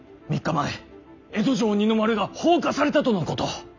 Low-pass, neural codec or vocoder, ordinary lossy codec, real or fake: 7.2 kHz; none; none; real